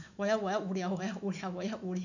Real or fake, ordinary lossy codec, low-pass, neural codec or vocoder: fake; none; 7.2 kHz; autoencoder, 48 kHz, 128 numbers a frame, DAC-VAE, trained on Japanese speech